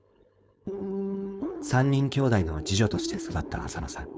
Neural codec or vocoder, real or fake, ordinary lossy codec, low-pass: codec, 16 kHz, 4.8 kbps, FACodec; fake; none; none